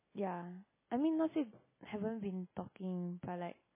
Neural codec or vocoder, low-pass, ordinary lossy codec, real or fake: none; 3.6 kHz; MP3, 16 kbps; real